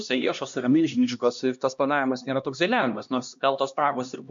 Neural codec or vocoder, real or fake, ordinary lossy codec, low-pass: codec, 16 kHz, 1 kbps, X-Codec, HuBERT features, trained on LibriSpeech; fake; MP3, 64 kbps; 7.2 kHz